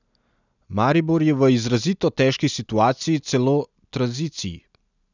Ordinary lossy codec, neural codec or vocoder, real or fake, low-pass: none; none; real; 7.2 kHz